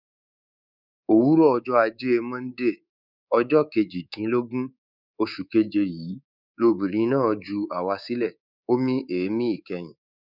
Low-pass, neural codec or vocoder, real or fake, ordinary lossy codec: 5.4 kHz; codec, 24 kHz, 3.1 kbps, DualCodec; fake; none